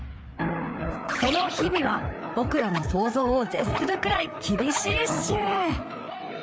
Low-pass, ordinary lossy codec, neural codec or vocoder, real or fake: none; none; codec, 16 kHz, 4 kbps, FreqCodec, larger model; fake